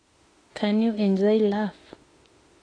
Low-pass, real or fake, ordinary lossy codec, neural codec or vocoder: 9.9 kHz; fake; AAC, 32 kbps; autoencoder, 48 kHz, 32 numbers a frame, DAC-VAE, trained on Japanese speech